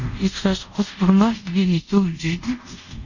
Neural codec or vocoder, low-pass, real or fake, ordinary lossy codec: codec, 24 kHz, 0.5 kbps, DualCodec; 7.2 kHz; fake; none